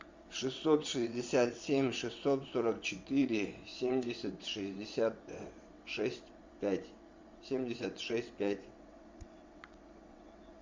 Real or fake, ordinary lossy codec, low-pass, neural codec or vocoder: fake; MP3, 64 kbps; 7.2 kHz; vocoder, 22.05 kHz, 80 mel bands, WaveNeXt